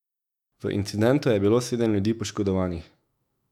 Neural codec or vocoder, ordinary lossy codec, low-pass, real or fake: autoencoder, 48 kHz, 128 numbers a frame, DAC-VAE, trained on Japanese speech; none; 19.8 kHz; fake